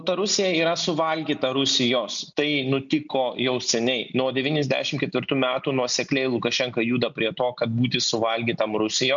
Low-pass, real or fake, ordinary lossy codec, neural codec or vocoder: 7.2 kHz; real; MP3, 64 kbps; none